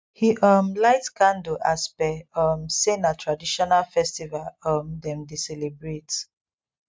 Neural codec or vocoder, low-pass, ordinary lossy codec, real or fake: none; none; none; real